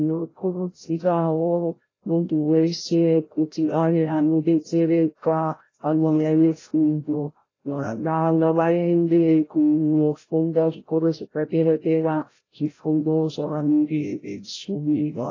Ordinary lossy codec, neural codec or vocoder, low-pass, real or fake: AAC, 32 kbps; codec, 16 kHz, 0.5 kbps, FreqCodec, larger model; 7.2 kHz; fake